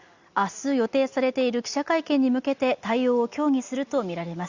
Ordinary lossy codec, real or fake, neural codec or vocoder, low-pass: Opus, 64 kbps; real; none; 7.2 kHz